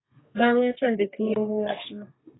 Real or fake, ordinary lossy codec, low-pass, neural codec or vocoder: fake; AAC, 16 kbps; 7.2 kHz; codec, 32 kHz, 1.9 kbps, SNAC